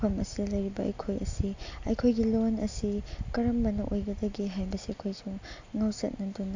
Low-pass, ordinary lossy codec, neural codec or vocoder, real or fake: 7.2 kHz; AAC, 48 kbps; none; real